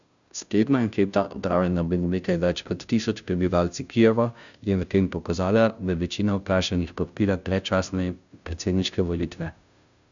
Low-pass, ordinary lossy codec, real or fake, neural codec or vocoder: 7.2 kHz; none; fake; codec, 16 kHz, 0.5 kbps, FunCodec, trained on Chinese and English, 25 frames a second